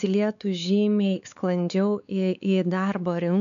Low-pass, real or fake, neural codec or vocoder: 7.2 kHz; fake; codec, 16 kHz, 4 kbps, X-Codec, WavLM features, trained on Multilingual LibriSpeech